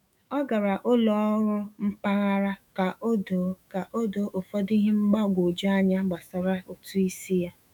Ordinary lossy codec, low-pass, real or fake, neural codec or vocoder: none; 19.8 kHz; fake; autoencoder, 48 kHz, 128 numbers a frame, DAC-VAE, trained on Japanese speech